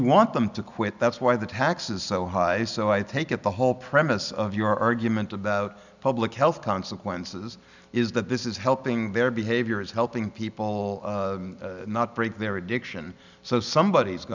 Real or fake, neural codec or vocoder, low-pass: real; none; 7.2 kHz